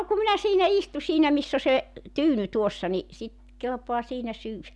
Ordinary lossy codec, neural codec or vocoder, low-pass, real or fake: none; none; none; real